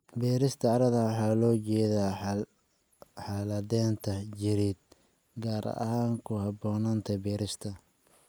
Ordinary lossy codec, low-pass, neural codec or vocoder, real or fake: none; none; none; real